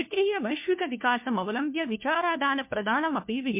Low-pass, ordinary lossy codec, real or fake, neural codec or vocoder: 3.6 kHz; MP3, 32 kbps; fake; codec, 16 kHz, 1 kbps, FunCodec, trained on LibriTTS, 50 frames a second